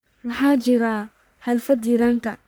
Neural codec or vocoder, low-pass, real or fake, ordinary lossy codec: codec, 44.1 kHz, 1.7 kbps, Pupu-Codec; none; fake; none